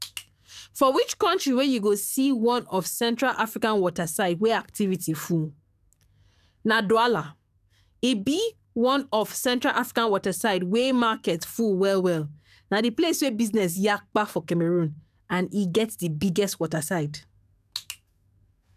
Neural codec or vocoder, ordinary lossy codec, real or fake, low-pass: codec, 44.1 kHz, 7.8 kbps, DAC; none; fake; 14.4 kHz